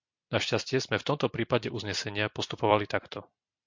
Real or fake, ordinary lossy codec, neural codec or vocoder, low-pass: fake; MP3, 48 kbps; vocoder, 44.1 kHz, 128 mel bands every 256 samples, BigVGAN v2; 7.2 kHz